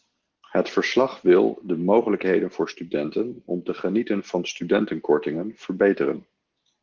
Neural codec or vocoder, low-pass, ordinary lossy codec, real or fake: none; 7.2 kHz; Opus, 16 kbps; real